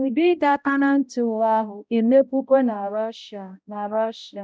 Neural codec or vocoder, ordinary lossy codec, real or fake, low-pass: codec, 16 kHz, 0.5 kbps, X-Codec, HuBERT features, trained on balanced general audio; none; fake; none